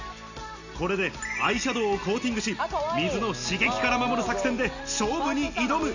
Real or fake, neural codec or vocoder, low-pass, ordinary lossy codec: real; none; 7.2 kHz; none